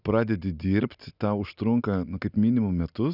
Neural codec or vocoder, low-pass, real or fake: none; 5.4 kHz; real